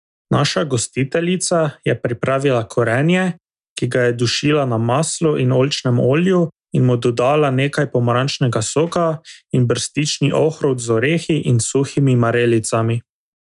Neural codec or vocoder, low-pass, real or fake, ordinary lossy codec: none; 10.8 kHz; real; none